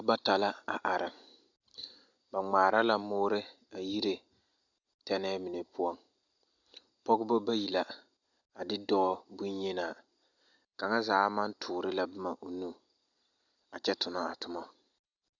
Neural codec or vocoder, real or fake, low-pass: none; real; 7.2 kHz